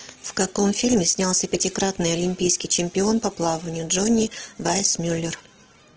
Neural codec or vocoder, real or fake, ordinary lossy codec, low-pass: none; real; Opus, 16 kbps; 7.2 kHz